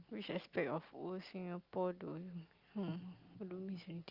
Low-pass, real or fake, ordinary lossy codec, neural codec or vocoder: 5.4 kHz; real; Opus, 24 kbps; none